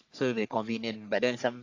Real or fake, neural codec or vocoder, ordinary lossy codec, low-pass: fake; codec, 44.1 kHz, 3.4 kbps, Pupu-Codec; AAC, 48 kbps; 7.2 kHz